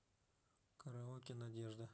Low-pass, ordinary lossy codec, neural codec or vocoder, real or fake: none; none; none; real